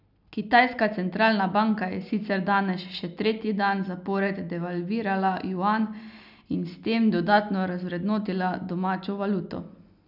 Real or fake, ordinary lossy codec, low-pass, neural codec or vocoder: real; none; 5.4 kHz; none